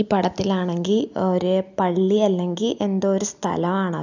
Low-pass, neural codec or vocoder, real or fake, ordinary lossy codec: 7.2 kHz; none; real; none